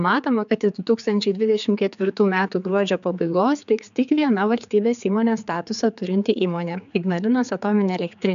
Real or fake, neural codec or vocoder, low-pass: fake; codec, 16 kHz, 4 kbps, X-Codec, HuBERT features, trained on general audio; 7.2 kHz